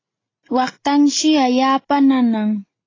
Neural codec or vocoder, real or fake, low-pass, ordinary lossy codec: none; real; 7.2 kHz; AAC, 32 kbps